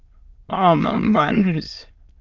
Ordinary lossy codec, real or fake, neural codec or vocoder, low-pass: Opus, 32 kbps; fake; autoencoder, 22.05 kHz, a latent of 192 numbers a frame, VITS, trained on many speakers; 7.2 kHz